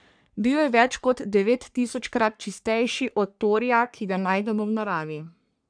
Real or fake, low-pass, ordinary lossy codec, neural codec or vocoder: fake; 9.9 kHz; none; codec, 44.1 kHz, 3.4 kbps, Pupu-Codec